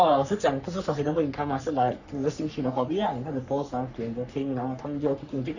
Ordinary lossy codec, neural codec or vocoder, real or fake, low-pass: none; codec, 44.1 kHz, 3.4 kbps, Pupu-Codec; fake; 7.2 kHz